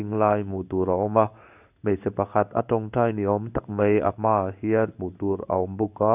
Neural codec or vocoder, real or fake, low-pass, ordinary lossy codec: codec, 16 kHz in and 24 kHz out, 1 kbps, XY-Tokenizer; fake; 3.6 kHz; none